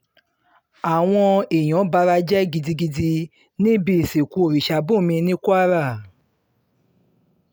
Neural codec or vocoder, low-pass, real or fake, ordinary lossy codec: none; none; real; none